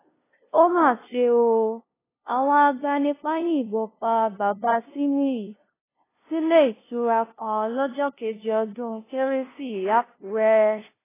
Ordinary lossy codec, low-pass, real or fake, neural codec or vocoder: AAC, 16 kbps; 3.6 kHz; fake; codec, 16 kHz, 0.5 kbps, FunCodec, trained on LibriTTS, 25 frames a second